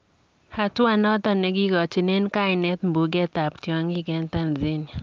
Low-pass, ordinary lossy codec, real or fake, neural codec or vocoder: 7.2 kHz; Opus, 24 kbps; real; none